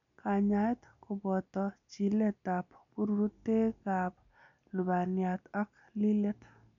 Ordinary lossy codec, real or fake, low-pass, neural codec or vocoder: none; real; 7.2 kHz; none